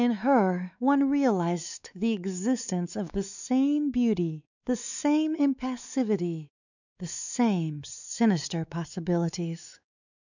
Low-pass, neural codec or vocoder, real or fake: 7.2 kHz; codec, 16 kHz, 4 kbps, X-Codec, WavLM features, trained on Multilingual LibriSpeech; fake